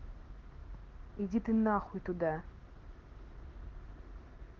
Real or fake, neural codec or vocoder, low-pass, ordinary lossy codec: real; none; 7.2 kHz; Opus, 16 kbps